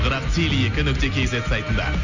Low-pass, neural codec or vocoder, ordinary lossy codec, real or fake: 7.2 kHz; none; none; real